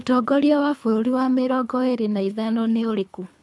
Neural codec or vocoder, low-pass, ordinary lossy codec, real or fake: codec, 24 kHz, 3 kbps, HILCodec; none; none; fake